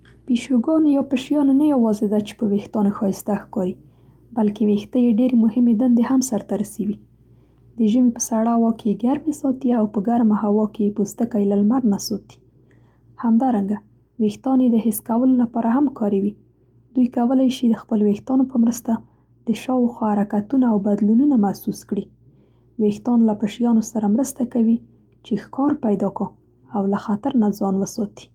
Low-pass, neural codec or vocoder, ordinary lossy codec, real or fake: 19.8 kHz; none; Opus, 32 kbps; real